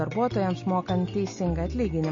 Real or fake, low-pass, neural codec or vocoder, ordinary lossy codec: real; 7.2 kHz; none; MP3, 32 kbps